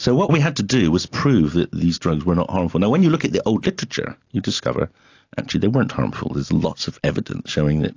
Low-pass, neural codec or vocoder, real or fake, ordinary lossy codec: 7.2 kHz; none; real; AAC, 48 kbps